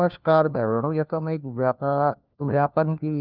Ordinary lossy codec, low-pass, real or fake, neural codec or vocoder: Opus, 24 kbps; 5.4 kHz; fake; codec, 16 kHz, 1 kbps, FunCodec, trained on LibriTTS, 50 frames a second